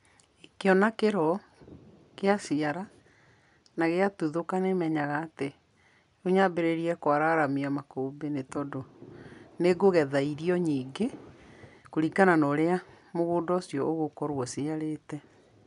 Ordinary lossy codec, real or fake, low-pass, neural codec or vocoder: none; real; 10.8 kHz; none